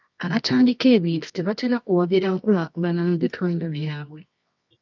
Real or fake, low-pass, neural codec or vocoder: fake; 7.2 kHz; codec, 24 kHz, 0.9 kbps, WavTokenizer, medium music audio release